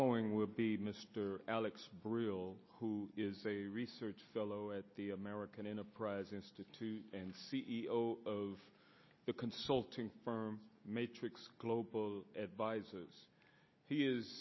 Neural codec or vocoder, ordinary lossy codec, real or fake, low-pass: none; MP3, 24 kbps; real; 7.2 kHz